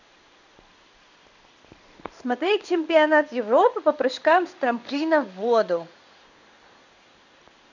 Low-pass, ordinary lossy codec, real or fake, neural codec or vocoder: 7.2 kHz; none; fake; codec, 16 kHz in and 24 kHz out, 1 kbps, XY-Tokenizer